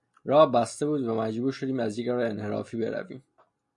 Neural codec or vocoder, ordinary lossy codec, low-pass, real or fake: none; MP3, 48 kbps; 10.8 kHz; real